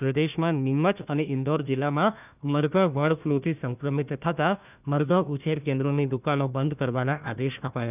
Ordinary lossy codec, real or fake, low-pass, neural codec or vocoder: none; fake; 3.6 kHz; codec, 16 kHz, 1 kbps, FunCodec, trained on Chinese and English, 50 frames a second